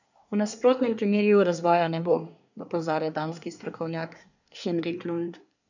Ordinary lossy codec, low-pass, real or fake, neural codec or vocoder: none; 7.2 kHz; fake; codec, 24 kHz, 1 kbps, SNAC